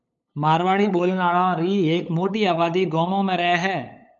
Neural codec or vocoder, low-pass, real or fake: codec, 16 kHz, 8 kbps, FunCodec, trained on LibriTTS, 25 frames a second; 7.2 kHz; fake